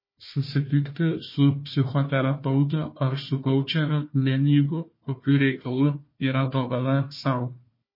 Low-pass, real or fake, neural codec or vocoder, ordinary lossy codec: 5.4 kHz; fake; codec, 16 kHz, 1 kbps, FunCodec, trained on Chinese and English, 50 frames a second; MP3, 24 kbps